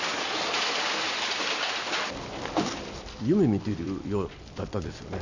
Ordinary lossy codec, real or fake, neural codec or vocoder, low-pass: none; real; none; 7.2 kHz